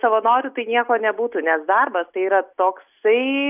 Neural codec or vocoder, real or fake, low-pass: none; real; 3.6 kHz